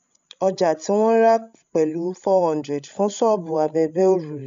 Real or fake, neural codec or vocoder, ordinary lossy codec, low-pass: fake; codec, 16 kHz, 16 kbps, FreqCodec, larger model; MP3, 64 kbps; 7.2 kHz